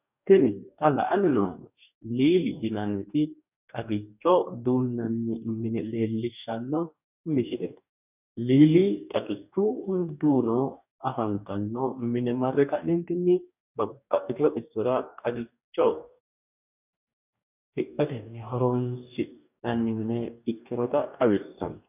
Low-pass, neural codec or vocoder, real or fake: 3.6 kHz; codec, 44.1 kHz, 2.6 kbps, DAC; fake